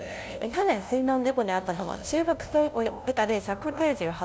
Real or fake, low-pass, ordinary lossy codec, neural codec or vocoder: fake; none; none; codec, 16 kHz, 0.5 kbps, FunCodec, trained on LibriTTS, 25 frames a second